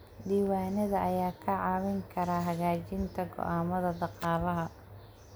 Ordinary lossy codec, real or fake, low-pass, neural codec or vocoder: none; real; none; none